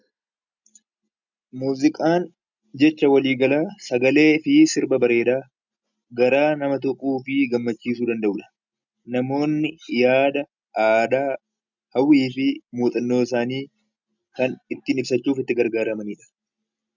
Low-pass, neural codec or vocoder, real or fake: 7.2 kHz; none; real